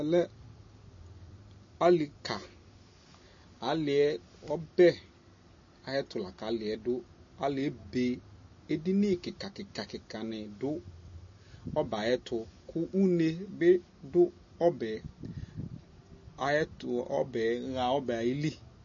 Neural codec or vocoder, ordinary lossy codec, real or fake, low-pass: none; MP3, 32 kbps; real; 7.2 kHz